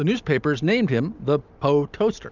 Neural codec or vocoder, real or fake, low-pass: none; real; 7.2 kHz